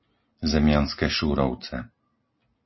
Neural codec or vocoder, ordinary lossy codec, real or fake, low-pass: none; MP3, 24 kbps; real; 7.2 kHz